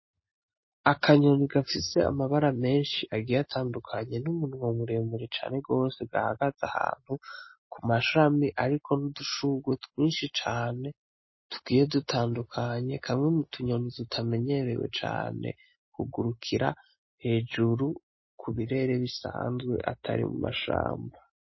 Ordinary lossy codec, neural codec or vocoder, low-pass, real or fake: MP3, 24 kbps; none; 7.2 kHz; real